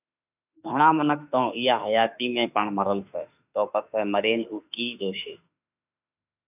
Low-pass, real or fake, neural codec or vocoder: 3.6 kHz; fake; autoencoder, 48 kHz, 32 numbers a frame, DAC-VAE, trained on Japanese speech